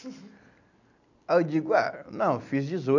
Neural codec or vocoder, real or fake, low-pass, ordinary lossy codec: none; real; 7.2 kHz; none